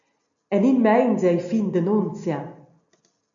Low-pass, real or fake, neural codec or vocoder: 7.2 kHz; real; none